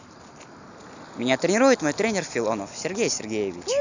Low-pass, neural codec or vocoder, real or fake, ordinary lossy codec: 7.2 kHz; none; real; none